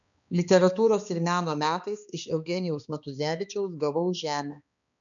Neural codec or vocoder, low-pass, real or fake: codec, 16 kHz, 2 kbps, X-Codec, HuBERT features, trained on balanced general audio; 7.2 kHz; fake